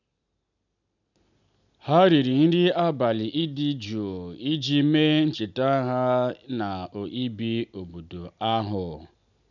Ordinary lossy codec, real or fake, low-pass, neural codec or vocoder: none; real; 7.2 kHz; none